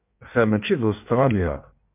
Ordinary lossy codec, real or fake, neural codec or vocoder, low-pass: MP3, 32 kbps; fake; codec, 16 kHz in and 24 kHz out, 1.1 kbps, FireRedTTS-2 codec; 3.6 kHz